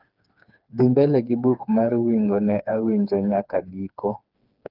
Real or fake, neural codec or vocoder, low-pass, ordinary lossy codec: fake; codec, 16 kHz, 4 kbps, FreqCodec, smaller model; 5.4 kHz; Opus, 32 kbps